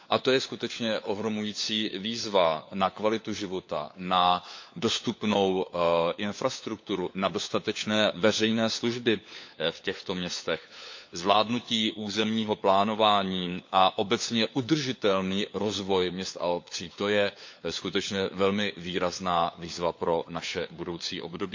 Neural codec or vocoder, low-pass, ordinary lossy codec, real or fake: codec, 16 kHz, 4 kbps, FunCodec, trained on LibriTTS, 50 frames a second; 7.2 kHz; MP3, 48 kbps; fake